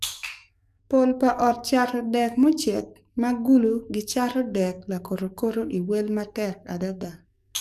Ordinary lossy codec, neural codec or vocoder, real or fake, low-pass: none; codec, 44.1 kHz, 7.8 kbps, DAC; fake; 14.4 kHz